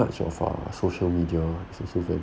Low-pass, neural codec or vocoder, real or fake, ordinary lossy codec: none; none; real; none